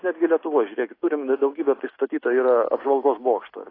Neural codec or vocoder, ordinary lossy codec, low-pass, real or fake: none; AAC, 24 kbps; 5.4 kHz; real